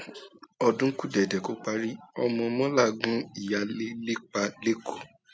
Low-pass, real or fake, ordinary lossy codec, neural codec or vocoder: none; real; none; none